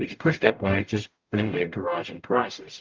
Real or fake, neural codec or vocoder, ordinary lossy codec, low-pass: fake; codec, 44.1 kHz, 0.9 kbps, DAC; Opus, 32 kbps; 7.2 kHz